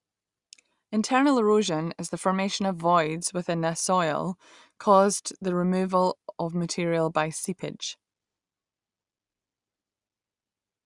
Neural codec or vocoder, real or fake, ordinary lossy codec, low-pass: none; real; Opus, 64 kbps; 10.8 kHz